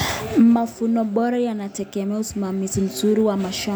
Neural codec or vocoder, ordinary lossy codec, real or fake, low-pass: none; none; real; none